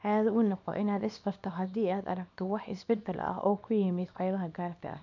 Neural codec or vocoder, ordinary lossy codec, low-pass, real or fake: codec, 24 kHz, 0.9 kbps, WavTokenizer, small release; none; 7.2 kHz; fake